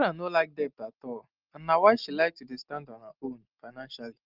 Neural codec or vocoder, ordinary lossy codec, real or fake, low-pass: none; Opus, 24 kbps; real; 5.4 kHz